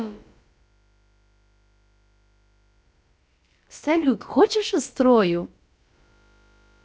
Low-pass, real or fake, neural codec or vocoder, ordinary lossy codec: none; fake; codec, 16 kHz, about 1 kbps, DyCAST, with the encoder's durations; none